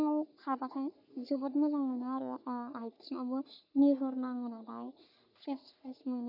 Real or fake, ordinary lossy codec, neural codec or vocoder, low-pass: fake; none; codec, 44.1 kHz, 3.4 kbps, Pupu-Codec; 5.4 kHz